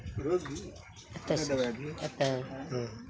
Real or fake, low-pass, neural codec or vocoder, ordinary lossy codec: real; none; none; none